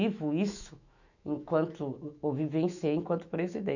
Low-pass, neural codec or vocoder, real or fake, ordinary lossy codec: 7.2 kHz; autoencoder, 48 kHz, 128 numbers a frame, DAC-VAE, trained on Japanese speech; fake; none